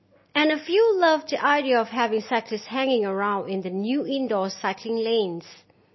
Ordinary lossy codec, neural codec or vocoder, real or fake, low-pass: MP3, 24 kbps; none; real; 7.2 kHz